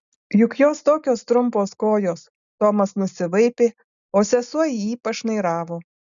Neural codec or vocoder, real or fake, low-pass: none; real; 7.2 kHz